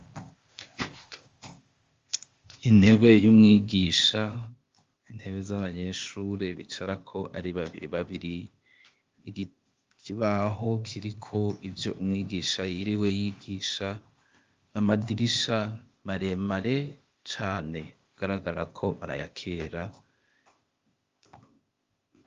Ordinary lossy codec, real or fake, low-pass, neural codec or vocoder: Opus, 32 kbps; fake; 7.2 kHz; codec, 16 kHz, 0.8 kbps, ZipCodec